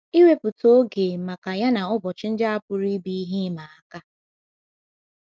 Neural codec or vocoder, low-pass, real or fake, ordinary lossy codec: none; none; real; none